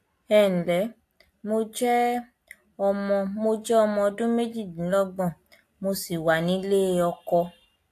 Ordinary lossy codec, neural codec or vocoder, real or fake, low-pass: AAC, 64 kbps; none; real; 14.4 kHz